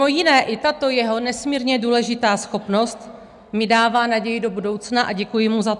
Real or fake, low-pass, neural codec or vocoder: real; 10.8 kHz; none